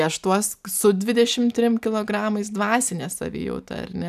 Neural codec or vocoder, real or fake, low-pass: none; real; 14.4 kHz